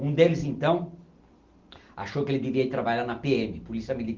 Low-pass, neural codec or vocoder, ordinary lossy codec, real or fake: 7.2 kHz; none; Opus, 32 kbps; real